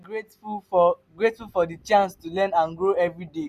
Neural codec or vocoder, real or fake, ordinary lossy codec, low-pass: none; real; none; 14.4 kHz